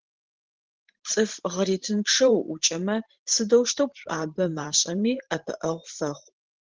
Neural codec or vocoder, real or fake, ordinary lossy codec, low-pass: vocoder, 44.1 kHz, 128 mel bands every 512 samples, BigVGAN v2; fake; Opus, 16 kbps; 7.2 kHz